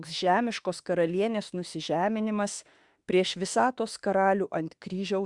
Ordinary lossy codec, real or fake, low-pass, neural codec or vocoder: Opus, 64 kbps; fake; 10.8 kHz; autoencoder, 48 kHz, 32 numbers a frame, DAC-VAE, trained on Japanese speech